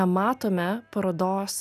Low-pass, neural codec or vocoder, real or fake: 14.4 kHz; none; real